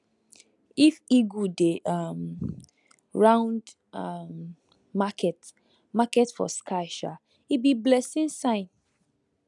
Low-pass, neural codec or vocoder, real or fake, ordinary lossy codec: 10.8 kHz; none; real; none